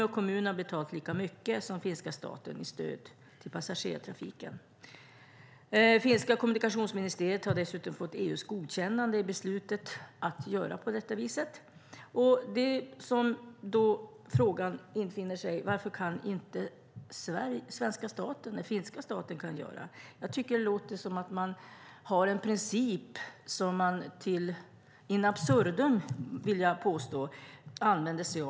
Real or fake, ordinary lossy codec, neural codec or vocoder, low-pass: real; none; none; none